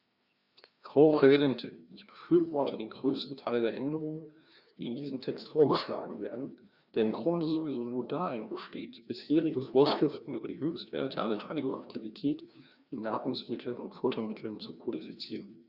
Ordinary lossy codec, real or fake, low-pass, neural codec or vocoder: Opus, 64 kbps; fake; 5.4 kHz; codec, 16 kHz, 1 kbps, FreqCodec, larger model